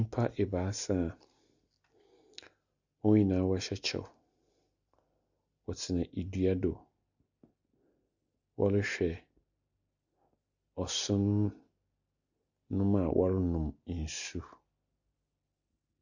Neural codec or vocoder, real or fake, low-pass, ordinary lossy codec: none; real; 7.2 kHz; Opus, 64 kbps